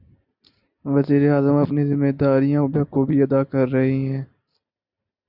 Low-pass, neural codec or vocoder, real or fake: 5.4 kHz; none; real